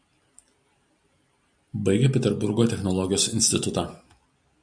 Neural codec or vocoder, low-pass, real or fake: none; 9.9 kHz; real